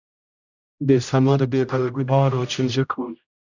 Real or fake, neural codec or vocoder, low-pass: fake; codec, 16 kHz, 0.5 kbps, X-Codec, HuBERT features, trained on general audio; 7.2 kHz